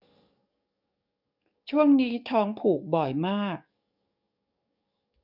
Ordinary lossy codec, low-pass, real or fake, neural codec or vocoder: none; 5.4 kHz; fake; codec, 44.1 kHz, 7.8 kbps, DAC